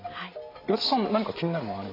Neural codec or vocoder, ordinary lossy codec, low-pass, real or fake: none; AAC, 24 kbps; 5.4 kHz; real